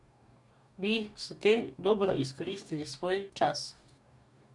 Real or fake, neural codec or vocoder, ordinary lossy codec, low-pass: fake; codec, 44.1 kHz, 2.6 kbps, DAC; none; 10.8 kHz